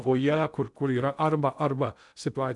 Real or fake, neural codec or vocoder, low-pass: fake; codec, 16 kHz in and 24 kHz out, 0.6 kbps, FocalCodec, streaming, 2048 codes; 10.8 kHz